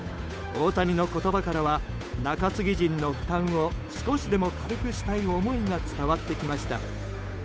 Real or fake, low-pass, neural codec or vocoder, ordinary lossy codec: fake; none; codec, 16 kHz, 8 kbps, FunCodec, trained on Chinese and English, 25 frames a second; none